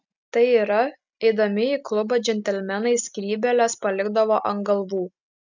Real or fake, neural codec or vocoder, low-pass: real; none; 7.2 kHz